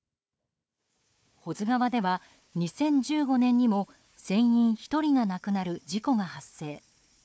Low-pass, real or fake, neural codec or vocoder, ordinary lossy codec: none; fake; codec, 16 kHz, 4 kbps, FunCodec, trained on Chinese and English, 50 frames a second; none